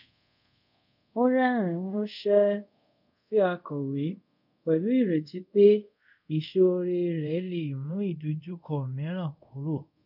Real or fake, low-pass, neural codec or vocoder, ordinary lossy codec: fake; 5.4 kHz; codec, 24 kHz, 0.5 kbps, DualCodec; none